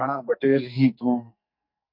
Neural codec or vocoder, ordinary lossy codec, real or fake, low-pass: codec, 44.1 kHz, 2.6 kbps, SNAC; MP3, 48 kbps; fake; 5.4 kHz